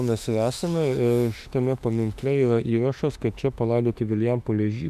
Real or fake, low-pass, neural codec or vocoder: fake; 14.4 kHz; autoencoder, 48 kHz, 32 numbers a frame, DAC-VAE, trained on Japanese speech